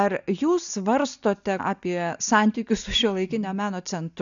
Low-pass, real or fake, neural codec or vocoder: 7.2 kHz; real; none